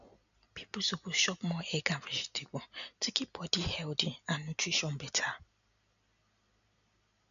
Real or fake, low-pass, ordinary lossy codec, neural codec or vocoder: real; 7.2 kHz; none; none